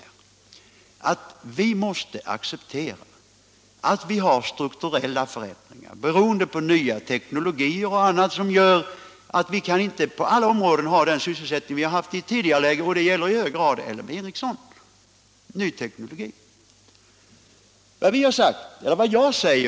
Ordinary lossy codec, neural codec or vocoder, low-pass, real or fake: none; none; none; real